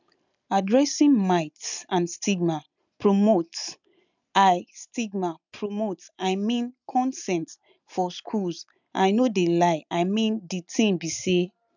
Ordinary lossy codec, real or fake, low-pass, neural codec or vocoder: none; real; 7.2 kHz; none